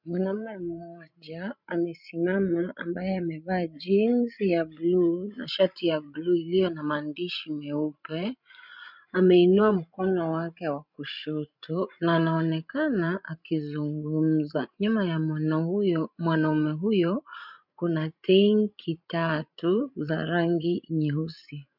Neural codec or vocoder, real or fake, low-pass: codec, 16 kHz, 16 kbps, FreqCodec, larger model; fake; 5.4 kHz